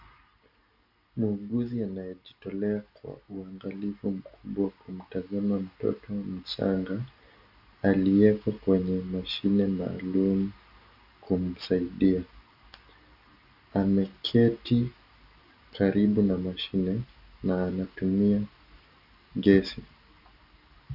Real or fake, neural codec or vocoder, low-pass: real; none; 5.4 kHz